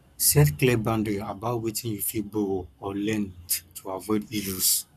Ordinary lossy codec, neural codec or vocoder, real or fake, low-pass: none; codec, 44.1 kHz, 7.8 kbps, Pupu-Codec; fake; 14.4 kHz